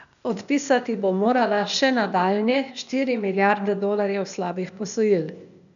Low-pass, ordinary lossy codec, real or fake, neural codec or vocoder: 7.2 kHz; none; fake; codec, 16 kHz, 0.8 kbps, ZipCodec